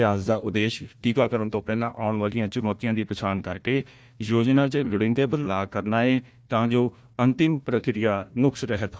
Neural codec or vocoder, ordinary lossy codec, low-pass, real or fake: codec, 16 kHz, 1 kbps, FunCodec, trained on Chinese and English, 50 frames a second; none; none; fake